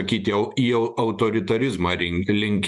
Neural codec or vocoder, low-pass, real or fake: vocoder, 48 kHz, 128 mel bands, Vocos; 10.8 kHz; fake